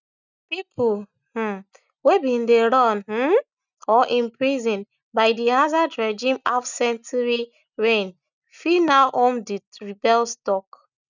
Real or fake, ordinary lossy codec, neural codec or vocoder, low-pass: real; none; none; 7.2 kHz